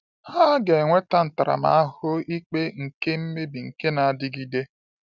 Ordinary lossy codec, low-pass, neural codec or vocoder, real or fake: none; 7.2 kHz; none; real